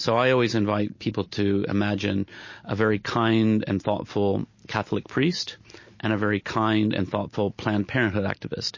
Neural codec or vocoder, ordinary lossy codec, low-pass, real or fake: none; MP3, 32 kbps; 7.2 kHz; real